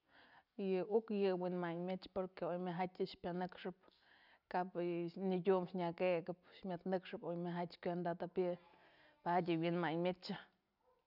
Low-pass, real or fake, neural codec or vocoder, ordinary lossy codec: 5.4 kHz; real; none; none